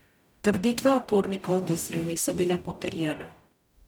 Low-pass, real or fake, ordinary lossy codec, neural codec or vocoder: none; fake; none; codec, 44.1 kHz, 0.9 kbps, DAC